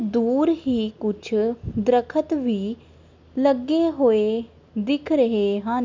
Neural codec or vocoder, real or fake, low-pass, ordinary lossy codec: none; real; 7.2 kHz; MP3, 64 kbps